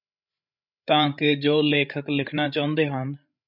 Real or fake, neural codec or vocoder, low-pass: fake; codec, 16 kHz, 16 kbps, FreqCodec, larger model; 5.4 kHz